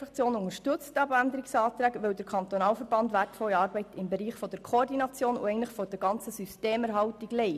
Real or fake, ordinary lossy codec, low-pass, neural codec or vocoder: real; none; 14.4 kHz; none